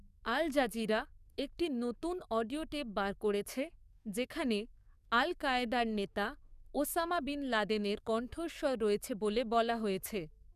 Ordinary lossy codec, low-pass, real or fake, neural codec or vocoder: none; 14.4 kHz; fake; codec, 44.1 kHz, 7.8 kbps, DAC